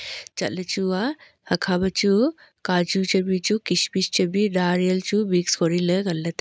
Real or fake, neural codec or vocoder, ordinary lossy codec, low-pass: real; none; none; none